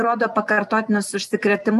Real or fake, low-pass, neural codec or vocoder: real; 14.4 kHz; none